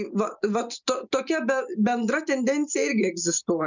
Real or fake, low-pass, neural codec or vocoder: real; 7.2 kHz; none